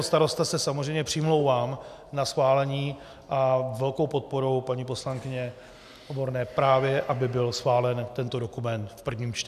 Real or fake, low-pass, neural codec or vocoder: real; 14.4 kHz; none